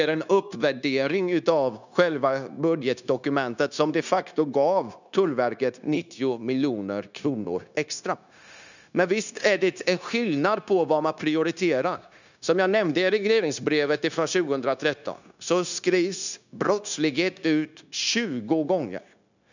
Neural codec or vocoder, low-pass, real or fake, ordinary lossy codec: codec, 16 kHz, 0.9 kbps, LongCat-Audio-Codec; 7.2 kHz; fake; none